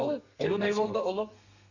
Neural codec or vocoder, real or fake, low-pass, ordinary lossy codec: codec, 32 kHz, 1.9 kbps, SNAC; fake; 7.2 kHz; none